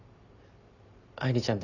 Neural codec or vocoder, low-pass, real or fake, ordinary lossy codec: none; 7.2 kHz; real; none